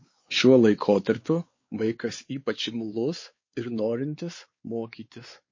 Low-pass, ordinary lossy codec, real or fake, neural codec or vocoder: 7.2 kHz; MP3, 32 kbps; fake; codec, 16 kHz, 4 kbps, X-Codec, WavLM features, trained on Multilingual LibriSpeech